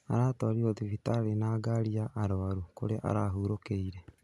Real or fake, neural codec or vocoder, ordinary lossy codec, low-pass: real; none; none; none